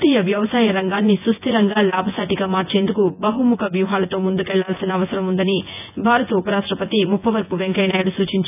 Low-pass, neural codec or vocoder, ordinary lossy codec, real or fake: 3.6 kHz; vocoder, 24 kHz, 100 mel bands, Vocos; none; fake